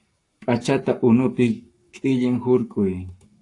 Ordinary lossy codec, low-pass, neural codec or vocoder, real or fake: AAC, 48 kbps; 10.8 kHz; codec, 44.1 kHz, 7.8 kbps, Pupu-Codec; fake